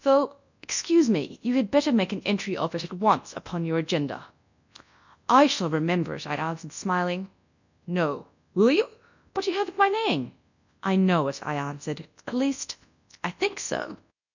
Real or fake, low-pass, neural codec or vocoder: fake; 7.2 kHz; codec, 24 kHz, 0.9 kbps, WavTokenizer, large speech release